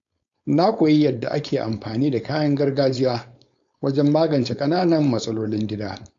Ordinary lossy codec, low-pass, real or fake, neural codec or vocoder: AAC, 64 kbps; 7.2 kHz; fake; codec, 16 kHz, 4.8 kbps, FACodec